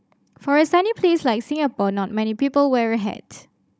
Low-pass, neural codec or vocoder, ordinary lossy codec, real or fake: none; codec, 16 kHz, 16 kbps, FunCodec, trained on Chinese and English, 50 frames a second; none; fake